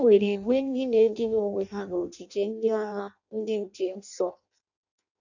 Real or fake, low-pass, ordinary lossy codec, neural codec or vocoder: fake; 7.2 kHz; none; codec, 16 kHz in and 24 kHz out, 0.6 kbps, FireRedTTS-2 codec